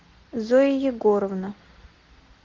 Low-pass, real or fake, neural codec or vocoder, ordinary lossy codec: 7.2 kHz; real; none; Opus, 16 kbps